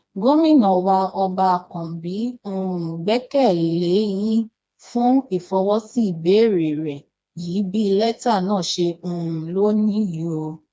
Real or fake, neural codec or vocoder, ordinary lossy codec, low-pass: fake; codec, 16 kHz, 2 kbps, FreqCodec, smaller model; none; none